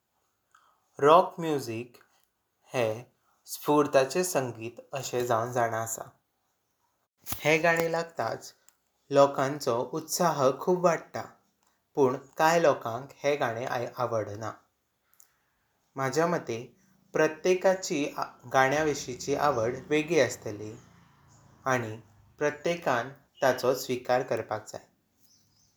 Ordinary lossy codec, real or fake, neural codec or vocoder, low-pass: none; real; none; none